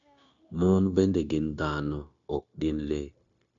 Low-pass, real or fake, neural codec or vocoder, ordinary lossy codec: 7.2 kHz; fake; codec, 16 kHz, 0.9 kbps, LongCat-Audio-Codec; none